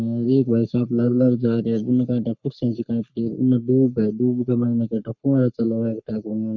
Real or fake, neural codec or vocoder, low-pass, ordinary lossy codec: fake; codec, 44.1 kHz, 3.4 kbps, Pupu-Codec; 7.2 kHz; none